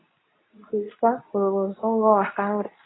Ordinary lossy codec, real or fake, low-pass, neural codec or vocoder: AAC, 16 kbps; fake; 7.2 kHz; codec, 24 kHz, 0.9 kbps, WavTokenizer, medium speech release version 2